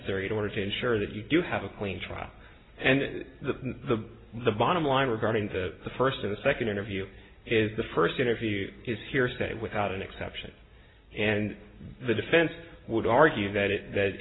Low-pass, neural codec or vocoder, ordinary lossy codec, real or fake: 7.2 kHz; none; AAC, 16 kbps; real